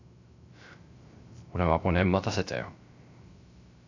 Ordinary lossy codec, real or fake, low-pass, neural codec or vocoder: AAC, 48 kbps; fake; 7.2 kHz; codec, 16 kHz, 0.3 kbps, FocalCodec